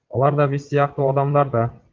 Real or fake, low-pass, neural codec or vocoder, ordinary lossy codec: fake; 7.2 kHz; vocoder, 22.05 kHz, 80 mel bands, WaveNeXt; Opus, 32 kbps